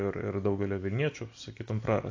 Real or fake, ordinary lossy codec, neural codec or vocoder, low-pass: real; AAC, 48 kbps; none; 7.2 kHz